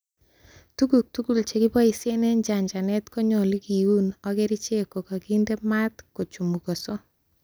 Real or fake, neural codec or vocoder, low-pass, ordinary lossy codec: real; none; none; none